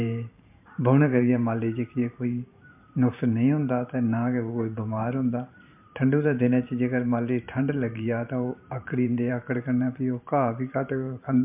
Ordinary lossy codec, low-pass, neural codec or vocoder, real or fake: MP3, 32 kbps; 3.6 kHz; vocoder, 44.1 kHz, 128 mel bands every 512 samples, BigVGAN v2; fake